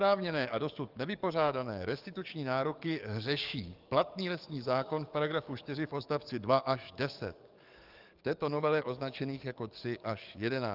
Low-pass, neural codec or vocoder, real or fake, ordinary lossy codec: 5.4 kHz; codec, 44.1 kHz, 7.8 kbps, DAC; fake; Opus, 32 kbps